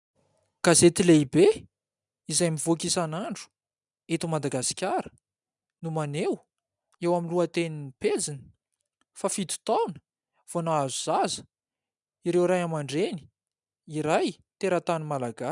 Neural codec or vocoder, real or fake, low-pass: none; real; 10.8 kHz